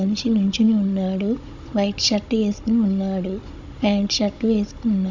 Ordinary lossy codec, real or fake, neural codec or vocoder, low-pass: MP3, 64 kbps; fake; codec, 16 kHz, 16 kbps, FunCodec, trained on Chinese and English, 50 frames a second; 7.2 kHz